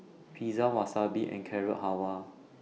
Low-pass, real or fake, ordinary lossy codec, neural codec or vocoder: none; real; none; none